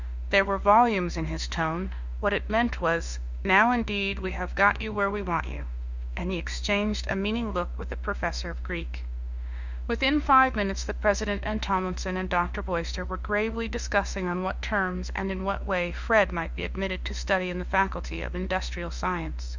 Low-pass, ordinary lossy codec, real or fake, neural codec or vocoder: 7.2 kHz; Opus, 64 kbps; fake; autoencoder, 48 kHz, 32 numbers a frame, DAC-VAE, trained on Japanese speech